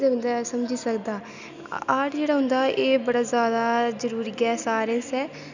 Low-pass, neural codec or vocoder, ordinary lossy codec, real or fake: 7.2 kHz; none; none; real